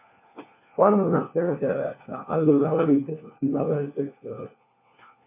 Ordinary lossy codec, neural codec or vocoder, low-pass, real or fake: MP3, 24 kbps; codec, 16 kHz, 1 kbps, FunCodec, trained on LibriTTS, 50 frames a second; 3.6 kHz; fake